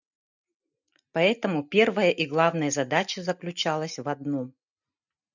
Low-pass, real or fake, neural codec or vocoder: 7.2 kHz; real; none